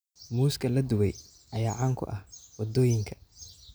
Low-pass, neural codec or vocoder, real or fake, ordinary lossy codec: none; none; real; none